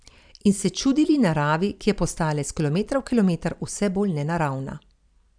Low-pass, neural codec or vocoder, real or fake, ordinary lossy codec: 9.9 kHz; vocoder, 44.1 kHz, 128 mel bands every 256 samples, BigVGAN v2; fake; none